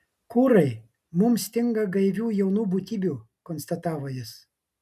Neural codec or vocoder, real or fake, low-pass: none; real; 14.4 kHz